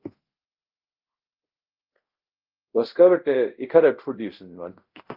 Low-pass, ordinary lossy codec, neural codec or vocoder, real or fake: 5.4 kHz; Opus, 32 kbps; codec, 24 kHz, 0.5 kbps, DualCodec; fake